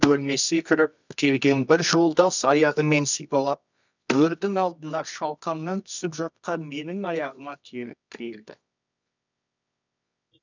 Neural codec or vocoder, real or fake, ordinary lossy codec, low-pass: codec, 24 kHz, 0.9 kbps, WavTokenizer, medium music audio release; fake; none; 7.2 kHz